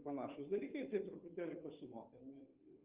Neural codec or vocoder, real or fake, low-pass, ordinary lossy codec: codec, 16 kHz, 2 kbps, FunCodec, trained on Chinese and English, 25 frames a second; fake; 3.6 kHz; Opus, 32 kbps